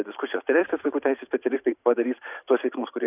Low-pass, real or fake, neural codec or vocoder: 3.6 kHz; fake; vocoder, 44.1 kHz, 128 mel bands every 512 samples, BigVGAN v2